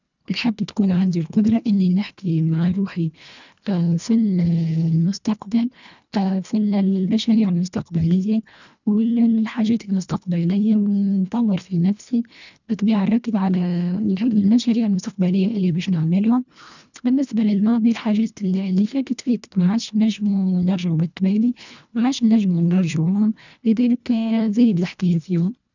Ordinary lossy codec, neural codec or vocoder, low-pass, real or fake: none; codec, 24 kHz, 1.5 kbps, HILCodec; 7.2 kHz; fake